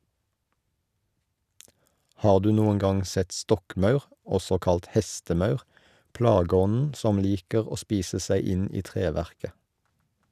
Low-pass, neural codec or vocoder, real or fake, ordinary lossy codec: 14.4 kHz; none; real; none